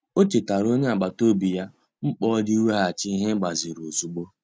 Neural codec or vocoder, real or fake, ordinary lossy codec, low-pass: none; real; none; none